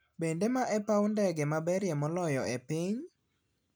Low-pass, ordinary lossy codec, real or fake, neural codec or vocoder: none; none; real; none